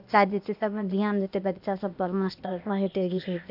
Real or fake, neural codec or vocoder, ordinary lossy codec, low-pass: fake; codec, 16 kHz, 0.8 kbps, ZipCodec; AAC, 48 kbps; 5.4 kHz